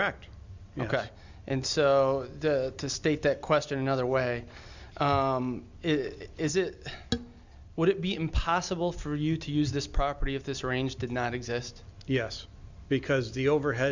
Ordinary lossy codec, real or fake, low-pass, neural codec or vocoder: Opus, 64 kbps; real; 7.2 kHz; none